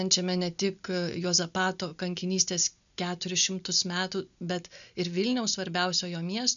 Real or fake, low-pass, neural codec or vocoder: real; 7.2 kHz; none